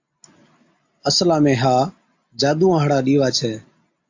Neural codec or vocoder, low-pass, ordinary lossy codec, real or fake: none; 7.2 kHz; AAC, 48 kbps; real